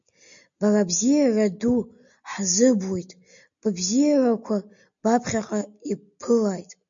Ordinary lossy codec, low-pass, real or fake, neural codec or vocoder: MP3, 64 kbps; 7.2 kHz; real; none